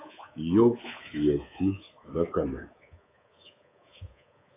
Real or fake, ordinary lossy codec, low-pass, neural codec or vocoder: fake; AAC, 16 kbps; 3.6 kHz; codec, 24 kHz, 3.1 kbps, DualCodec